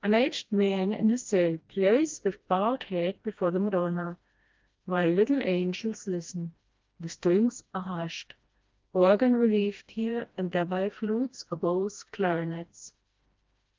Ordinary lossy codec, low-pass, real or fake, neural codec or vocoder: Opus, 24 kbps; 7.2 kHz; fake; codec, 16 kHz, 1 kbps, FreqCodec, smaller model